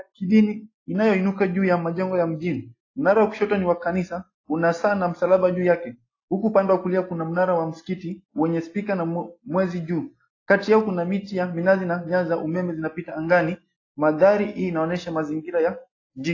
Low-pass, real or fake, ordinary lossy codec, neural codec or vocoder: 7.2 kHz; real; AAC, 32 kbps; none